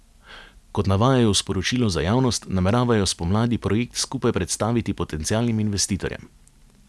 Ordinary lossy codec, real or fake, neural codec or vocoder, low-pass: none; real; none; none